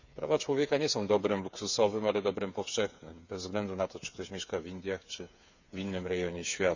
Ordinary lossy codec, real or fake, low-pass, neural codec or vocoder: none; fake; 7.2 kHz; codec, 16 kHz, 8 kbps, FreqCodec, smaller model